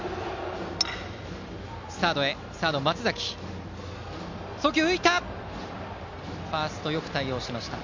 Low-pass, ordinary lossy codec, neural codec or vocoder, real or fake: 7.2 kHz; MP3, 64 kbps; none; real